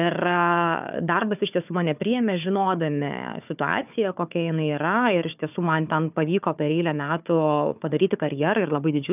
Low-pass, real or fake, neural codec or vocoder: 3.6 kHz; fake; codec, 16 kHz, 4 kbps, FunCodec, trained on Chinese and English, 50 frames a second